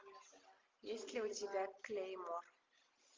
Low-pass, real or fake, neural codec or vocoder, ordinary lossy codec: 7.2 kHz; real; none; Opus, 24 kbps